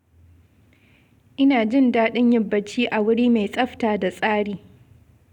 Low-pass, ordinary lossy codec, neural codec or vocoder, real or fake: 19.8 kHz; none; none; real